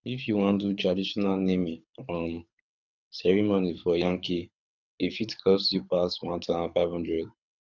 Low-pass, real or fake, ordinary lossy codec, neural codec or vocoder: 7.2 kHz; fake; none; codec, 24 kHz, 6 kbps, HILCodec